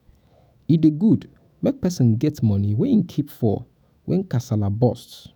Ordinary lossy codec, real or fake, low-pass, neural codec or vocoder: none; fake; none; autoencoder, 48 kHz, 128 numbers a frame, DAC-VAE, trained on Japanese speech